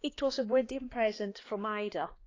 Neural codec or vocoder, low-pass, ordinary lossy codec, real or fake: codec, 16 kHz, 2 kbps, X-Codec, HuBERT features, trained on balanced general audio; 7.2 kHz; AAC, 32 kbps; fake